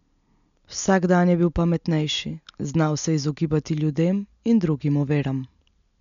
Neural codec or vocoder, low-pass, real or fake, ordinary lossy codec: none; 7.2 kHz; real; none